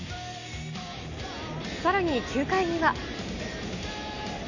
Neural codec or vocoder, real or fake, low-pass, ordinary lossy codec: none; real; 7.2 kHz; none